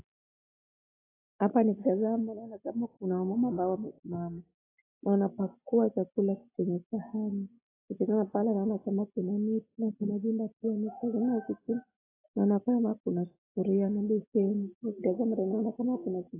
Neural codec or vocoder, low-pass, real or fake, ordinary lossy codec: none; 3.6 kHz; real; AAC, 16 kbps